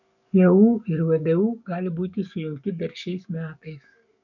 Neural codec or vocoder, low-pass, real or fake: codec, 44.1 kHz, 7.8 kbps, DAC; 7.2 kHz; fake